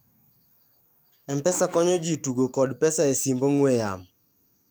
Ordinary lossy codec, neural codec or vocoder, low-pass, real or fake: none; codec, 44.1 kHz, 7.8 kbps, DAC; none; fake